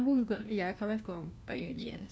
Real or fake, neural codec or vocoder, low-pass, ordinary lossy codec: fake; codec, 16 kHz, 1 kbps, FunCodec, trained on LibriTTS, 50 frames a second; none; none